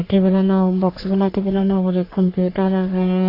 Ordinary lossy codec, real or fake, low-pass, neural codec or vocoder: AAC, 32 kbps; fake; 5.4 kHz; codec, 44.1 kHz, 3.4 kbps, Pupu-Codec